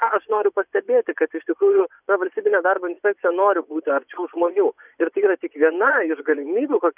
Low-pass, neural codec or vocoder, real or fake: 3.6 kHz; vocoder, 24 kHz, 100 mel bands, Vocos; fake